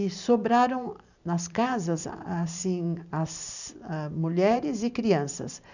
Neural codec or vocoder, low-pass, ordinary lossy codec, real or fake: vocoder, 44.1 kHz, 128 mel bands every 512 samples, BigVGAN v2; 7.2 kHz; none; fake